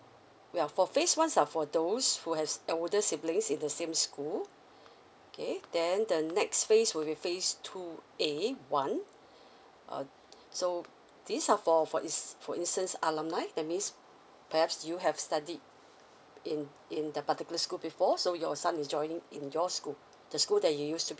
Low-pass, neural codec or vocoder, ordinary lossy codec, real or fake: none; none; none; real